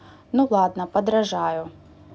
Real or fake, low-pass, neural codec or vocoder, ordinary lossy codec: real; none; none; none